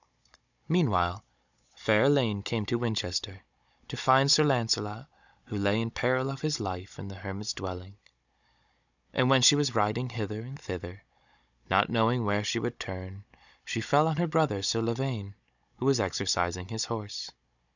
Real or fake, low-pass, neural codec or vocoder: fake; 7.2 kHz; codec, 16 kHz, 16 kbps, FunCodec, trained on Chinese and English, 50 frames a second